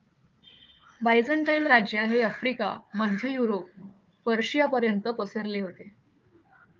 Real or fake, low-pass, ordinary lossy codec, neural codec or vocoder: fake; 7.2 kHz; Opus, 24 kbps; codec, 16 kHz, 4 kbps, FunCodec, trained on Chinese and English, 50 frames a second